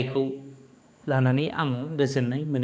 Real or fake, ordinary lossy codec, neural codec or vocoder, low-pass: fake; none; codec, 16 kHz, 2 kbps, X-Codec, HuBERT features, trained on balanced general audio; none